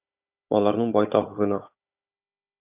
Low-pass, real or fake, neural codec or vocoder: 3.6 kHz; fake; codec, 16 kHz, 16 kbps, FunCodec, trained on Chinese and English, 50 frames a second